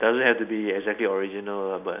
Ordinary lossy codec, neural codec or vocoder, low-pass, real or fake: none; none; 3.6 kHz; real